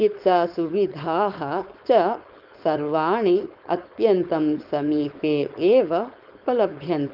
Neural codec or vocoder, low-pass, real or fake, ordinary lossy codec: codec, 16 kHz, 4.8 kbps, FACodec; 5.4 kHz; fake; Opus, 32 kbps